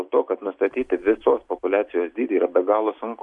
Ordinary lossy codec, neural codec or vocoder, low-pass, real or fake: MP3, 96 kbps; none; 10.8 kHz; real